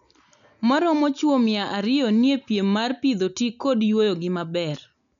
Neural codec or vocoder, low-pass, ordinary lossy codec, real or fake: none; 7.2 kHz; none; real